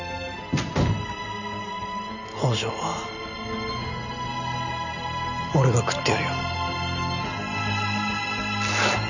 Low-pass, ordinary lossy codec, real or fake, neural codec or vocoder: 7.2 kHz; none; real; none